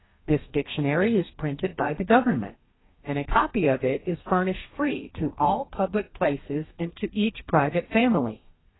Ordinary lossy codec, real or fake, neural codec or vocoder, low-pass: AAC, 16 kbps; fake; codec, 32 kHz, 1.9 kbps, SNAC; 7.2 kHz